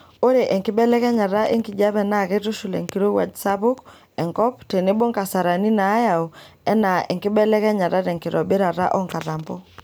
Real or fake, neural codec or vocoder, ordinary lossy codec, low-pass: real; none; none; none